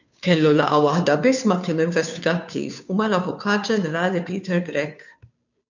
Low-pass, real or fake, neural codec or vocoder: 7.2 kHz; fake; codec, 16 kHz, 2 kbps, FunCodec, trained on Chinese and English, 25 frames a second